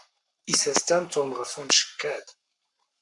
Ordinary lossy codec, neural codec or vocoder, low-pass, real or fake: Opus, 64 kbps; codec, 44.1 kHz, 7.8 kbps, Pupu-Codec; 10.8 kHz; fake